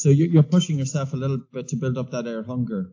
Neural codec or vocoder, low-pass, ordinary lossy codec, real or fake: none; 7.2 kHz; AAC, 48 kbps; real